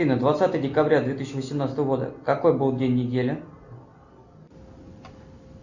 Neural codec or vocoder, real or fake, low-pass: none; real; 7.2 kHz